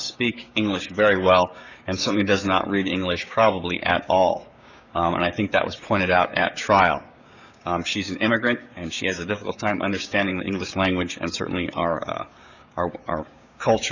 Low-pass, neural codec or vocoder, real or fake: 7.2 kHz; codec, 16 kHz, 6 kbps, DAC; fake